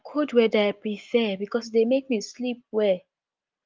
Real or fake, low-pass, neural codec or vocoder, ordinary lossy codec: real; 7.2 kHz; none; Opus, 24 kbps